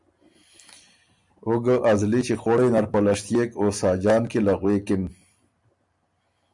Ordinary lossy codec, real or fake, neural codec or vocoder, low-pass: MP3, 96 kbps; fake; vocoder, 44.1 kHz, 128 mel bands every 256 samples, BigVGAN v2; 10.8 kHz